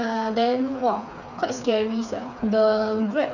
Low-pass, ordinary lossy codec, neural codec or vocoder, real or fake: 7.2 kHz; none; codec, 16 kHz, 4 kbps, FreqCodec, smaller model; fake